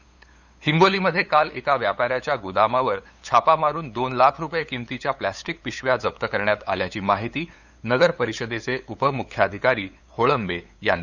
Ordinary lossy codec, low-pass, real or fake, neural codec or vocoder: none; 7.2 kHz; fake; codec, 16 kHz, 8 kbps, FunCodec, trained on LibriTTS, 25 frames a second